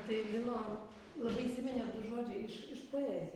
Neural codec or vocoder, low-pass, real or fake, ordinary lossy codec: none; 19.8 kHz; real; Opus, 16 kbps